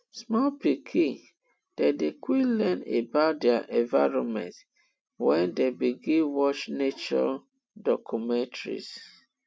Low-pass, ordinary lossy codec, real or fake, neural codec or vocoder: none; none; real; none